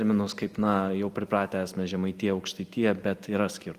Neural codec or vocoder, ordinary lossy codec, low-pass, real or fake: none; Opus, 24 kbps; 14.4 kHz; real